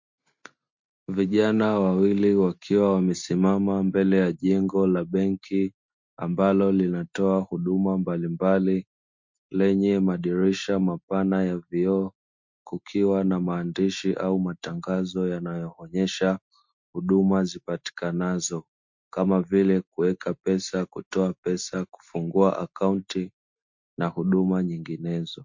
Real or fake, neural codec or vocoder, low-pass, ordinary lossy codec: real; none; 7.2 kHz; MP3, 48 kbps